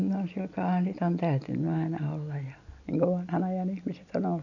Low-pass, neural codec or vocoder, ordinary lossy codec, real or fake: 7.2 kHz; none; none; real